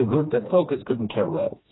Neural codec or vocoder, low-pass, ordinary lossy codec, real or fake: codec, 16 kHz, 1 kbps, FreqCodec, smaller model; 7.2 kHz; AAC, 16 kbps; fake